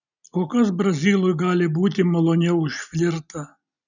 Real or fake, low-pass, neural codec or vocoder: real; 7.2 kHz; none